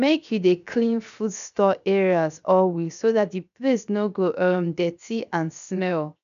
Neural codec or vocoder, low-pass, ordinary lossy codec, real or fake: codec, 16 kHz, 0.7 kbps, FocalCodec; 7.2 kHz; none; fake